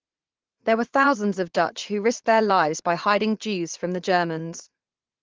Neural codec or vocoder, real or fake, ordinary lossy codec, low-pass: vocoder, 44.1 kHz, 80 mel bands, Vocos; fake; Opus, 16 kbps; 7.2 kHz